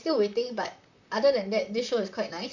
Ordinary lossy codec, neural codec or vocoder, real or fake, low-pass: none; vocoder, 44.1 kHz, 128 mel bands, Pupu-Vocoder; fake; 7.2 kHz